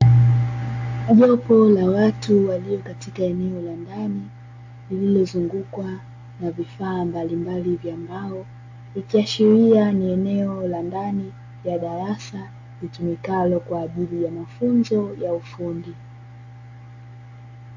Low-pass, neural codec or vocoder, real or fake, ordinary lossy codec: 7.2 kHz; none; real; AAC, 48 kbps